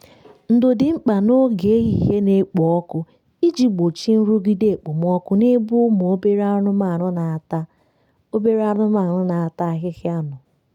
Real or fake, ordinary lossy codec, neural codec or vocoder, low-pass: real; none; none; 19.8 kHz